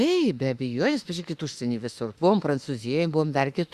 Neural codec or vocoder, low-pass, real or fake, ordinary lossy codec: autoencoder, 48 kHz, 32 numbers a frame, DAC-VAE, trained on Japanese speech; 14.4 kHz; fake; Opus, 64 kbps